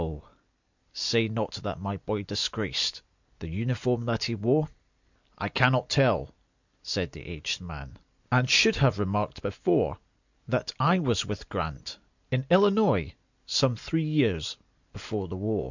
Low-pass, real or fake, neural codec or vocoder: 7.2 kHz; real; none